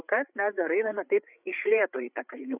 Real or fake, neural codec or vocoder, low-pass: fake; codec, 16 kHz, 8 kbps, FreqCodec, larger model; 3.6 kHz